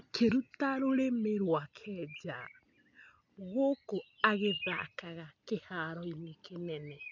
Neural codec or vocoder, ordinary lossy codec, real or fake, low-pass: none; none; real; 7.2 kHz